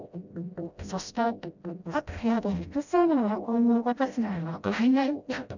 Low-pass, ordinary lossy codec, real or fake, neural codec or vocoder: 7.2 kHz; none; fake; codec, 16 kHz, 0.5 kbps, FreqCodec, smaller model